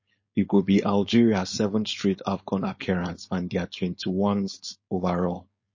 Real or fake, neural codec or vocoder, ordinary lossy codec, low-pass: fake; codec, 16 kHz, 4.8 kbps, FACodec; MP3, 32 kbps; 7.2 kHz